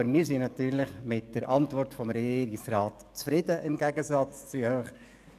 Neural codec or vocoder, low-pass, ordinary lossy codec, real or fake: codec, 44.1 kHz, 7.8 kbps, DAC; 14.4 kHz; none; fake